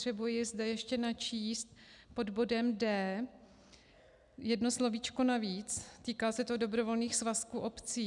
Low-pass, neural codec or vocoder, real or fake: 10.8 kHz; none; real